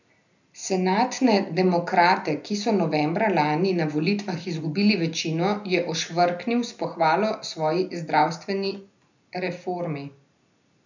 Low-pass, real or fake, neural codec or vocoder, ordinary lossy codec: 7.2 kHz; real; none; none